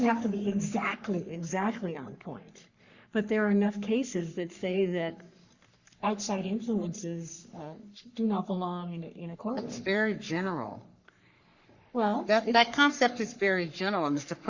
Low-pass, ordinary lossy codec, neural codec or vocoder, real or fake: 7.2 kHz; Opus, 64 kbps; codec, 44.1 kHz, 3.4 kbps, Pupu-Codec; fake